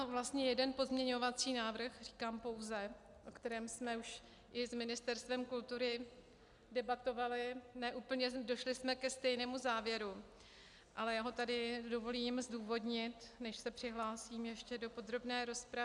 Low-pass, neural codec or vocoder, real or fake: 10.8 kHz; none; real